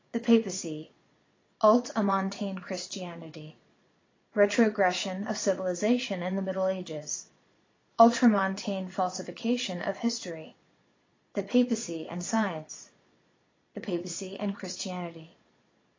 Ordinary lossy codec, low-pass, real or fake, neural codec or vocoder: AAC, 32 kbps; 7.2 kHz; fake; vocoder, 22.05 kHz, 80 mel bands, Vocos